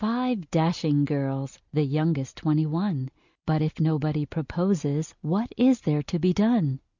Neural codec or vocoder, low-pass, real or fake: none; 7.2 kHz; real